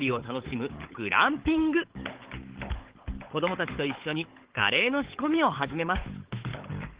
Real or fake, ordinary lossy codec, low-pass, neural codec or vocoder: fake; Opus, 16 kbps; 3.6 kHz; codec, 16 kHz, 16 kbps, FunCodec, trained on LibriTTS, 50 frames a second